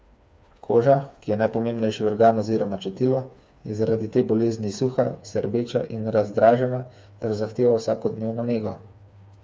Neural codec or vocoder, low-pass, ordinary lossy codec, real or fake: codec, 16 kHz, 4 kbps, FreqCodec, smaller model; none; none; fake